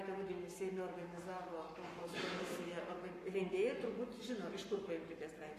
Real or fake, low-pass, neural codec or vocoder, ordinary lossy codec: fake; 14.4 kHz; codec, 44.1 kHz, 7.8 kbps, Pupu-Codec; AAC, 48 kbps